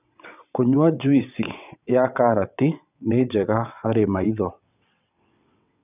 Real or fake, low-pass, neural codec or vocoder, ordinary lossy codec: fake; 3.6 kHz; vocoder, 22.05 kHz, 80 mel bands, WaveNeXt; none